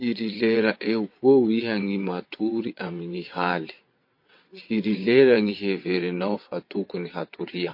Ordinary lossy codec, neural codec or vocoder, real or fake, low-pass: MP3, 32 kbps; vocoder, 22.05 kHz, 80 mel bands, WaveNeXt; fake; 5.4 kHz